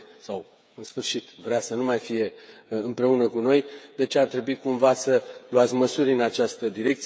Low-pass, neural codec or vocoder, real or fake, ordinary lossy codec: none; codec, 16 kHz, 8 kbps, FreqCodec, smaller model; fake; none